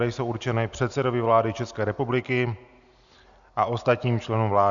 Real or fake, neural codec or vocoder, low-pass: real; none; 7.2 kHz